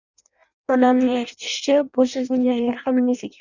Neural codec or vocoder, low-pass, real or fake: codec, 16 kHz in and 24 kHz out, 0.6 kbps, FireRedTTS-2 codec; 7.2 kHz; fake